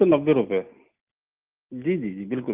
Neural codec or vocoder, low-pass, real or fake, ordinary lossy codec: none; 3.6 kHz; real; Opus, 24 kbps